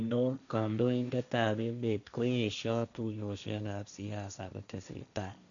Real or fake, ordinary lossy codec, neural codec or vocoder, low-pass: fake; none; codec, 16 kHz, 1.1 kbps, Voila-Tokenizer; 7.2 kHz